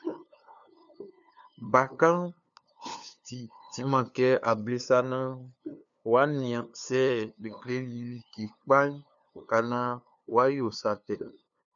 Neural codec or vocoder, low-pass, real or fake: codec, 16 kHz, 2 kbps, FunCodec, trained on LibriTTS, 25 frames a second; 7.2 kHz; fake